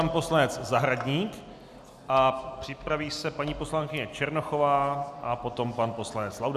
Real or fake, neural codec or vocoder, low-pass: real; none; 14.4 kHz